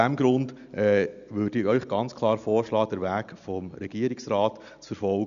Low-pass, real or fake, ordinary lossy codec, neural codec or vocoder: 7.2 kHz; real; none; none